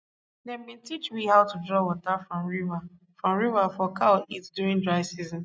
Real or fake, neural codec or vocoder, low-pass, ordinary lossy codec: real; none; none; none